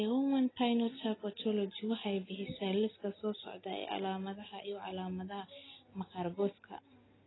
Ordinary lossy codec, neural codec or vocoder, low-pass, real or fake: AAC, 16 kbps; none; 7.2 kHz; real